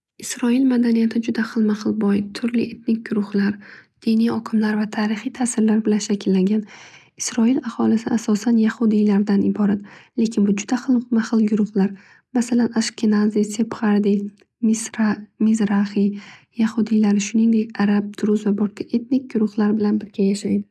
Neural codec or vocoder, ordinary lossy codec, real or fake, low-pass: none; none; real; none